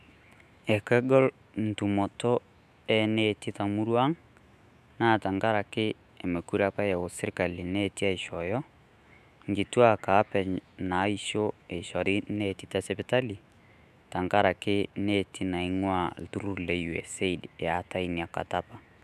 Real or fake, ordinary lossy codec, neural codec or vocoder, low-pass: fake; none; autoencoder, 48 kHz, 128 numbers a frame, DAC-VAE, trained on Japanese speech; 14.4 kHz